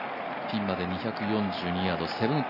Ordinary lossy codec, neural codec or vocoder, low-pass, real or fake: none; none; 5.4 kHz; real